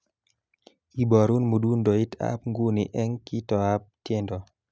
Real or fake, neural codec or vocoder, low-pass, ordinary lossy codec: real; none; none; none